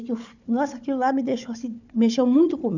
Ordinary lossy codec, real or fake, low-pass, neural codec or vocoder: none; fake; 7.2 kHz; codec, 16 kHz, 4 kbps, FunCodec, trained on Chinese and English, 50 frames a second